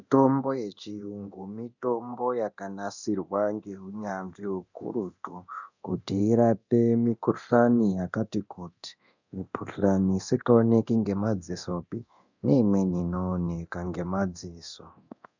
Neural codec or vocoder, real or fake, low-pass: codec, 24 kHz, 0.9 kbps, DualCodec; fake; 7.2 kHz